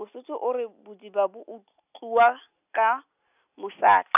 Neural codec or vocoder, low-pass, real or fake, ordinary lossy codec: none; 3.6 kHz; real; none